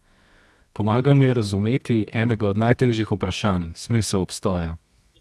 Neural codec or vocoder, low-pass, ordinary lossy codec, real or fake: codec, 24 kHz, 0.9 kbps, WavTokenizer, medium music audio release; none; none; fake